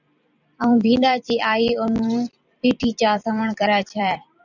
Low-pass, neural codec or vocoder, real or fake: 7.2 kHz; vocoder, 44.1 kHz, 128 mel bands every 256 samples, BigVGAN v2; fake